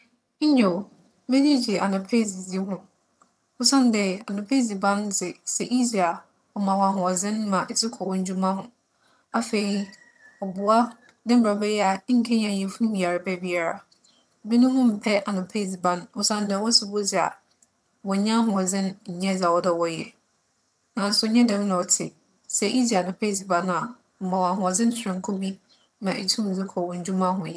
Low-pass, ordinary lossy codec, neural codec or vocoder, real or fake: none; none; vocoder, 22.05 kHz, 80 mel bands, HiFi-GAN; fake